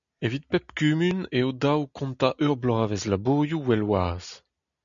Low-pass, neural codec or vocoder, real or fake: 7.2 kHz; none; real